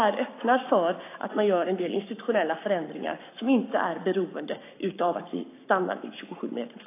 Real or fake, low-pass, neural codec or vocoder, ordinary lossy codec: fake; 3.6 kHz; codec, 44.1 kHz, 7.8 kbps, Pupu-Codec; AAC, 24 kbps